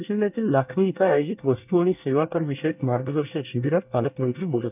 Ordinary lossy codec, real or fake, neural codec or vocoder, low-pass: none; fake; codec, 24 kHz, 1 kbps, SNAC; 3.6 kHz